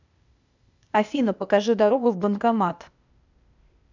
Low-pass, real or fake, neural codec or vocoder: 7.2 kHz; fake; codec, 16 kHz, 0.8 kbps, ZipCodec